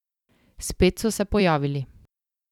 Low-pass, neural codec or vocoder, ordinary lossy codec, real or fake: 19.8 kHz; vocoder, 44.1 kHz, 128 mel bands every 256 samples, BigVGAN v2; none; fake